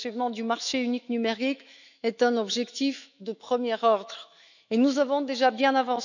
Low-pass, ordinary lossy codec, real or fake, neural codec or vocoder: 7.2 kHz; none; fake; autoencoder, 48 kHz, 128 numbers a frame, DAC-VAE, trained on Japanese speech